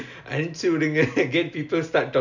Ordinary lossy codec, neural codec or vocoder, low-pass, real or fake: none; none; 7.2 kHz; real